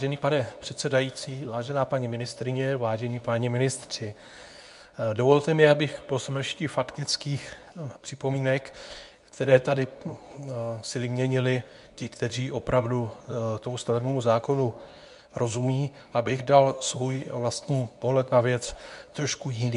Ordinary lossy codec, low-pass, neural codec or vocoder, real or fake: MP3, 96 kbps; 10.8 kHz; codec, 24 kHz, 0.9 kbps, WavTokenizer, medium speech release version 2; fake